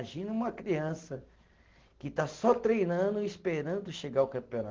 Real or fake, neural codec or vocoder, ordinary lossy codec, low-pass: real; none; Opus, 16 kbps; 7.2 kHz